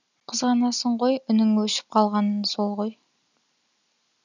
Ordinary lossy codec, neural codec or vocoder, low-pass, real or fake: none; none; 7.2 kHz; real